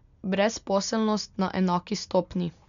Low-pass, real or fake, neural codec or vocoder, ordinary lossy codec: 7.2 kHz; real; none; none